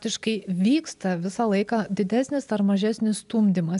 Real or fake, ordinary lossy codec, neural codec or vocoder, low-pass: real; AAC, 96 kbps; none; 10.8 kHz